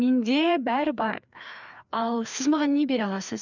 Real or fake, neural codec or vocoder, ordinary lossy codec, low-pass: fake; codec, 16 kHz, 2 kbps, FreqCodec, larger model; none; 7.2 kHz